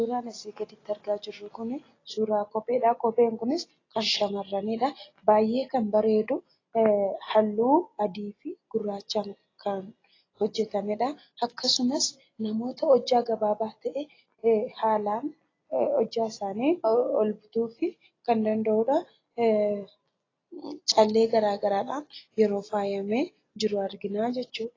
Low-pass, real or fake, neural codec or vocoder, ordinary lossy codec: 7.2 kHz; real; none; AAC, 32 kbps